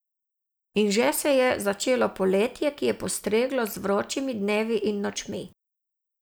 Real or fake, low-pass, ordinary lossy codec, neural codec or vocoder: real; none; none; none